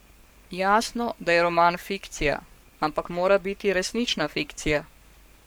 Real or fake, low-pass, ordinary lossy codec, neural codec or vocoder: fake; none; none; codec, 44.1 kHz, 7.8 kbps, Pupu-Codec